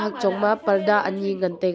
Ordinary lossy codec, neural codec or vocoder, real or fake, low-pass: none; none; real; none